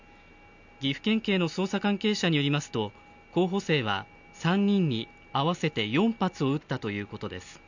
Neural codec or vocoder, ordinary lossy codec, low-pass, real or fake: none; none; 7.2 kHz; real